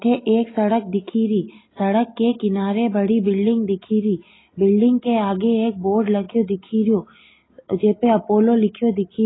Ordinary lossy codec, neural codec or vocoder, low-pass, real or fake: AAC, 16 kbps; none; 7.2 kHz; real